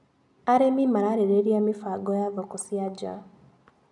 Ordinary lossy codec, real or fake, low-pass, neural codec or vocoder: none; real; 10.8 kHz; none